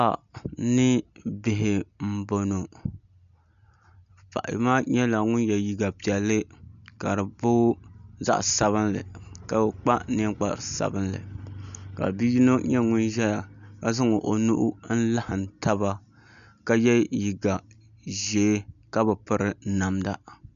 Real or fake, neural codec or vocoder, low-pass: real; none; 7.2 kHz